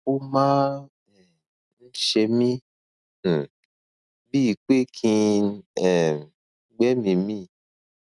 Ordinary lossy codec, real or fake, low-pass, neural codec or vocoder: none; real; 10.8 kHz; none